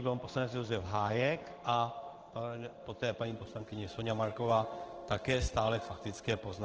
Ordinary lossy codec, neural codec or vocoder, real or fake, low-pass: Opus, 16 kbps; codec, 16 kHz in and 24 kHz out, 1 kbps, XY-Tokenizer; fake; 7.2 kHz